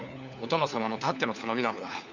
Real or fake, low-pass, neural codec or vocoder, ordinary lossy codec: fake; 7.2 kHz; codec, 16 kHz, 4 kbps, FunCodec, trained on LibriTTS, 50 frames a second; none